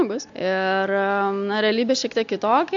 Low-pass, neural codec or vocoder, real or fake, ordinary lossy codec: 7.2 kHz; none; real; AAC, 64 kbps